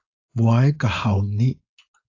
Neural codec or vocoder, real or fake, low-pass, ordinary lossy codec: codec, 16 kHz, 4.8 kbps, FACodec; fake; 7.2 kHz; AAC, 48 kbps